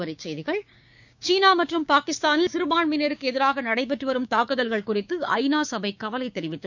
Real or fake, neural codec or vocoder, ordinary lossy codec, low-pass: fake; codec, 16 kHz, 6 kbps, DAC; none; 7.2 kHz